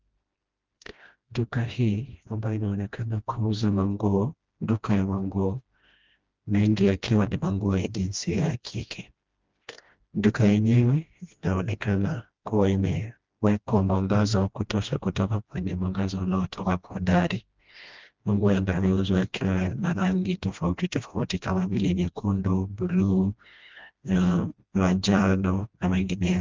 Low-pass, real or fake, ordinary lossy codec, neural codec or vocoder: 7.2 kHz; fake; Opus, 32 kbps; codec, 16 kHz, 1 kbps, FreqCodec, smaller model